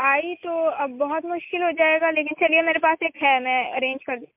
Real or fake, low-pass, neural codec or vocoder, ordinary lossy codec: real; 3.6 kHz; none; MP3, 32 kbps